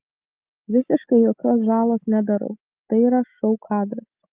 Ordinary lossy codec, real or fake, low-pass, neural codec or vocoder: Opus, 32 kbps; real; 3.6 kHz; none